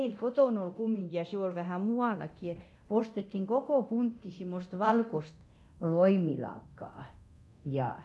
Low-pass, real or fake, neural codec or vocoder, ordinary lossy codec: none; fake; codec, 24 kHz, 0.9 kbps, DualCodec; none